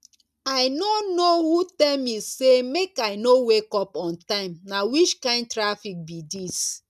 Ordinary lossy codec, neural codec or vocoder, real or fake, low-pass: none; none; real; 14.4 kHz